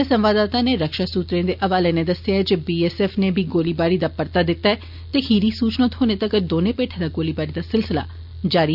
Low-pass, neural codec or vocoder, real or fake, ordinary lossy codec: 5.4 kHz; none; real; none